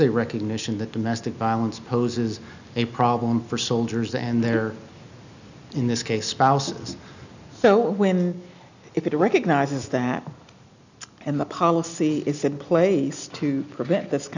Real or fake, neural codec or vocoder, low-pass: real; none; 7.2 kHz